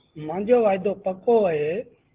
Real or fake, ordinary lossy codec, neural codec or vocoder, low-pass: real; Opus, 16 kbps; none; 3.6 kHz